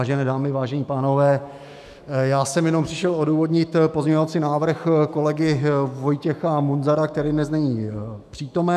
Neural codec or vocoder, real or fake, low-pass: autoencoder, 48 kHz, 128 numbers a frame, DAC-VAE, trained on Japanese speech; fake; 14.4 kHz